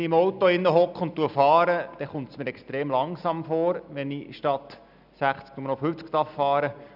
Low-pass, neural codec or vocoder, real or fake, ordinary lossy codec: 5.4 kHz; none; real; none